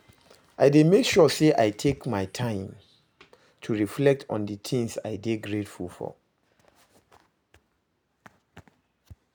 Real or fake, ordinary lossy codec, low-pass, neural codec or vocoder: real; none; none; none